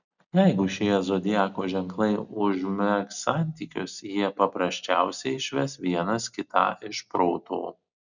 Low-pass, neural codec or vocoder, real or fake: 7.2 kHz; none; real